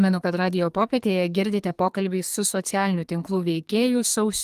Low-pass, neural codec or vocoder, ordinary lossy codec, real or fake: 14.4 kHz; codec, 44.1 kHz, 2.6 kbps, SNAC; Opus, 32 kbps; fake